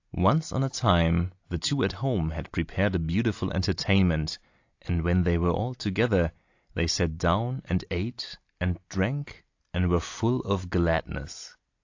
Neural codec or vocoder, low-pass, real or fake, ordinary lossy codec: none; 7.2 kHz; real; AAC, 48 kbps